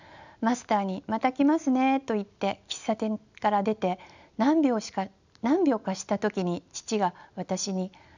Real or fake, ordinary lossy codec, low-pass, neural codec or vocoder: real; none; 7.2 kHz; none